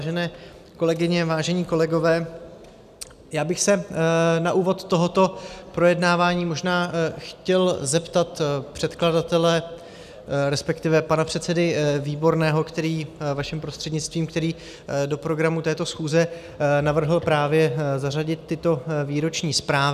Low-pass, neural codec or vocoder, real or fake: 14.4 kHz; none; real